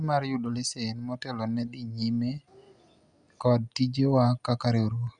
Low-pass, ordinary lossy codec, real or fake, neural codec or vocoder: 9.9 kHz; Opus, 64 kbps; real; none